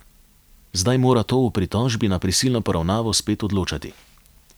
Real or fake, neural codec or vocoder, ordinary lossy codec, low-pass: real; none; none; none